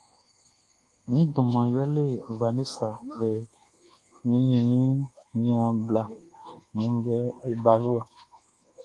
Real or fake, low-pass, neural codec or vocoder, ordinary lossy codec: fake; 10.8 kHz; codec, 24 kHz, 1.2 kbps, DualCodec; Opus, 24 kbps